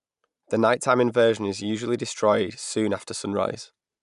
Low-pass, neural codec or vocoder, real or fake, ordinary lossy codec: 10.8 kHz; none; real; none